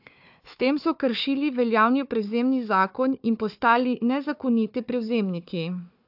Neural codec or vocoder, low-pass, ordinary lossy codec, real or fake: codec, 16 kHz, 4 kbps, FunCodec, trained on Chinese and English, 50 frames a second; 5.4 kHz; AAC, 48 kbps; fake